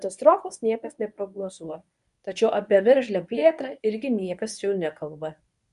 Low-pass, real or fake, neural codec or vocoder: 10.8 kHz; fake; codec, 24 kHz, 0.9 kbps, WavTokenizer, medium speech release version 1